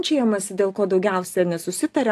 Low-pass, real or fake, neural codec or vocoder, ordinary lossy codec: 14.4 kHz; real; none; AAC, 64 kbps